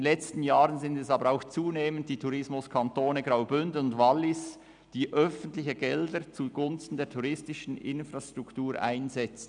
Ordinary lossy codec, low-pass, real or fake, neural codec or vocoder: none; 9.9 kHz; real; none